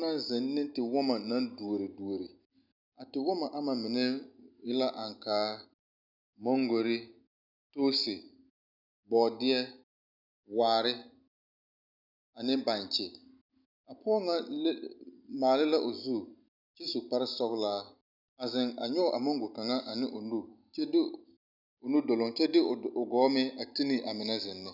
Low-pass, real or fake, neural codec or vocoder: 5.4 kHz; real; none